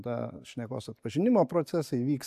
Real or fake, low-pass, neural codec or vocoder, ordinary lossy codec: real; 14.4 kHz; none; AAC, 96 kbps